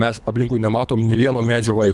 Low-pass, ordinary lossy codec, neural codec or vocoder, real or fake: 10.8 kHz; Opus, 64 kbps; codec, 24 kHz, 3 kbps, HILCodec; fake